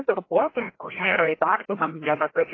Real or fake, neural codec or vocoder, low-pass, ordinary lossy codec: fake; codec, 16 kHz, 1 kbps, FreqCodec, larger model; 7.2 kHz; AAC, 32 kbps